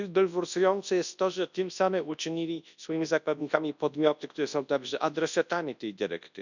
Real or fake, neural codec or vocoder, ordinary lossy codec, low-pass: fake; codec, 24 kHz, 0.9 kbps, WavTokenizer, large speech release; none; 7.2 kHz